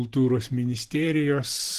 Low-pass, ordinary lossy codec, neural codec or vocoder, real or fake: 14.4 kHz; Opus, 16 kbps; vocoder, 44.1 kHz, 128 mel bands every 512 samples, BigVGAN v2; fake